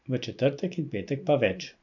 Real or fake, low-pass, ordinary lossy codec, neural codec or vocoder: real; 7.2 kHz; none; none